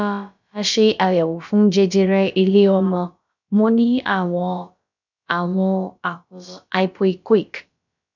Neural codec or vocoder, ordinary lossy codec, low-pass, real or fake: codec, 16 kHz, about 1 kbps, DyCAST, with the encoder's durations; none; 7.2 kHz; fake